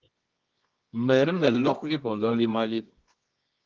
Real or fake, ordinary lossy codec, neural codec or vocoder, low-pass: fake; Opus, 32 kbps; codec, 24 kHz, 0.9 kbps, WavTokenizer, medium music audio release; 7.2 kHz